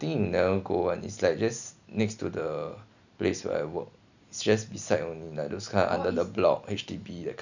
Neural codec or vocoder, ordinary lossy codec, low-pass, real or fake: none; none; 7.2 kHz; real